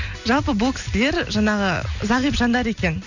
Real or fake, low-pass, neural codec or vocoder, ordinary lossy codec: real; 7.2 kHz; none; none